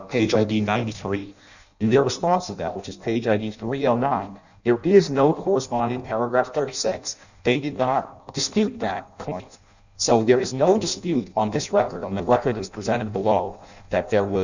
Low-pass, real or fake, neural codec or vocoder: 7.2 kHz; fake; codec, 16 kHz in and 24 kHz out, 0.6 kbps, FireRedTTS-2 codec